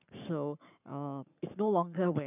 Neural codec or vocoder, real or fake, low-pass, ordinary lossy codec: codec, 44.1 kHz, 7.8 kbps, Pupu-Codec; fake; 3.6 kHz; none